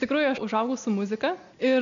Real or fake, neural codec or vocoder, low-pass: real; none; 7.2 kHz